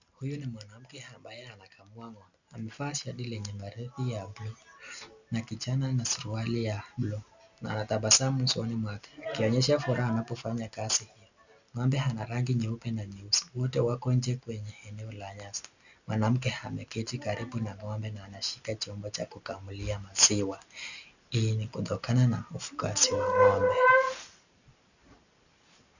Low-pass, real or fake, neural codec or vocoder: 7.2 kHz; real; none